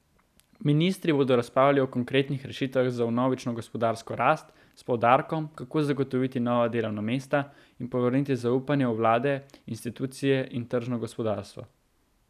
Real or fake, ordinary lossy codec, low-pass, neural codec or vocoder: real; none; 14.4 kHz; none